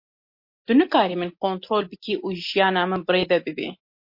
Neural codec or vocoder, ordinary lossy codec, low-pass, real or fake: none; MP3, 32 kbps; 5.4 kHz; real